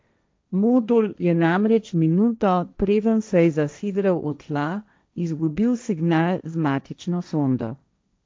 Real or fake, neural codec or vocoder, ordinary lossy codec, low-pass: fake; codec, 16 kHz, 1.1 kbps, Voila-Tokenizer; none; none